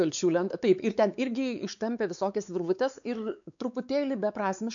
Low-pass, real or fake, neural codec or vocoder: 7.2 kHz; fake; codec, 16 kHz, 4 kbps, X-Codec, WavLM features, trained on Multilingual LibriSpeech